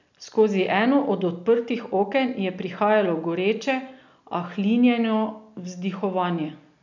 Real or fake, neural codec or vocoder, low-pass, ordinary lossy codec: real; none; 7.2 kHz; none